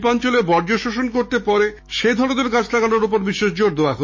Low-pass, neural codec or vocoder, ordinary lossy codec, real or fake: 7.2 kHz; autoencoder, 48 kHz, 128 numbers a frame, DAC-VAE, trained on Japanese speech; MP3, 32 kbps; fake